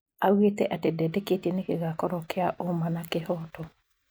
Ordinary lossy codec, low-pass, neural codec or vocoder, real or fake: none; none; none; real